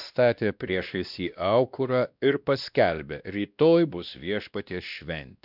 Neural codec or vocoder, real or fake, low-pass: codec, 16 kHz, 1 kbps, X-Codec, WavLM features, trained on Multilingual LibriSpeech; fake; 5.4 kHz